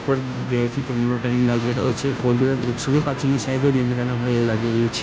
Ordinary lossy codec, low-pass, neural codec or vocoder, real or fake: none; none; codec, 16 kHz, 0.5 kbps, FunCodec, trained on Chinese and English, 25 frames a second; fake